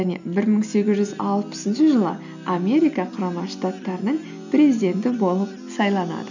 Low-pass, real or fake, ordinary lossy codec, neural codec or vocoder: 7.2 kHz; real; none; none